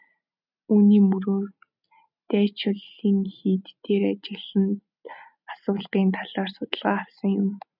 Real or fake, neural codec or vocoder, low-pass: real; none; 5.4 kHz